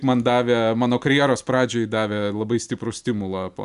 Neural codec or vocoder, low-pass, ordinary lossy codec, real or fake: none; 10.8 kHz; MP3, 96 kbps; real